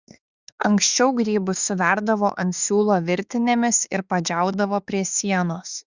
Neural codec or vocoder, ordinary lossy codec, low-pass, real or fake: autoencoder, 48 kHz, 32 numbers a frame, DAC-VAE, trained on Japanese speech; Opus, 64 kbps; 7.2 kHz; fake